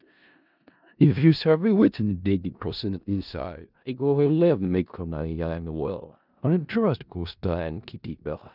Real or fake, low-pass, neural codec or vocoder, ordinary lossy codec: fake; 5.4 kHz; codec, 16 kHz in and 24 kHz out, 0.4 kbps, LongCat-Audio-Codec, four codebook decoder; none